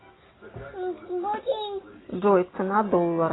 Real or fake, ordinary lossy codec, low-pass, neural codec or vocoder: real; AAC, 16 kbps; 7.2 kHz; none